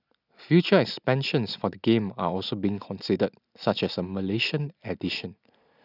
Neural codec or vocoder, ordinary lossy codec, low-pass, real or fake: none; none; 5.4 kHz; real